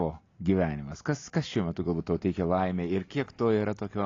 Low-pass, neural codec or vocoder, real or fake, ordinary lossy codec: 7.2 kHz; none; real; AAC, 32 kbps